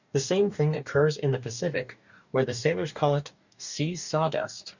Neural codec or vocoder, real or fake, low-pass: codec, 44.1 kHz, 2.6 kbps, DAC; fake; 7.2 kHz